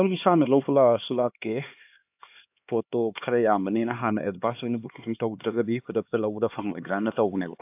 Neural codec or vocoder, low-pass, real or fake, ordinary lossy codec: codec, 16 kHz, 2 kbps, X-Codec, HuBERT features, trained on LibriSpeech; 3.6 kHz; fake; AAC, 32 kbps